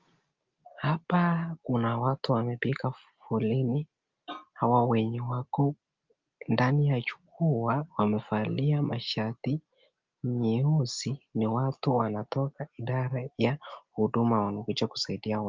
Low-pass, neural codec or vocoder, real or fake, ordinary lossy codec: 7.2 kHz; none; real; Opus, 32 kbps